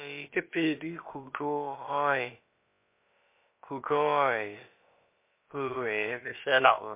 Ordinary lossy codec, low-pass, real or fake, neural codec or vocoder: MP3, 24 kbps; 3.6 kHz; fake; codec, 16 kHz, about 1 kbps, DyCAST, with the encoder's durations